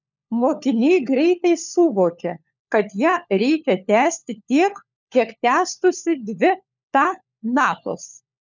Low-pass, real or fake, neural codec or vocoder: 7.2 kHz; fake; codec, 16 kHz, 4 kbps, FunCodec, trained on LibriTTS, 50 frames a second